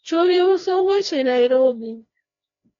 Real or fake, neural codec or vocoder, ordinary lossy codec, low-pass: fake; codec, 16 kHz, 1 kbps, FreqCodec, larger model; AAC, 32 kbps; 7.2 kHz